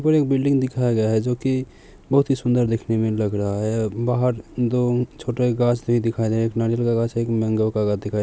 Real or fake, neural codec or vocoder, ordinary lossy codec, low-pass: real; none; none; none